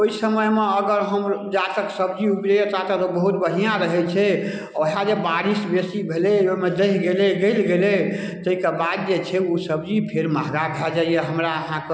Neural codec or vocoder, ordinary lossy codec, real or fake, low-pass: none; none; real; none